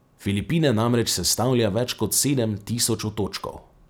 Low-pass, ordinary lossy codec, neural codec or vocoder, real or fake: none; none; none; real